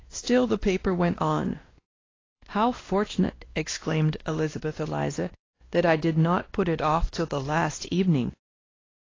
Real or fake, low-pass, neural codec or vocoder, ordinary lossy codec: fake; 7.2 kHz; codec, 16 kHz, 1 kbps, X-Codec, WavLM features, trained on Multilingual LibriSpeech; AAC, 32 kbps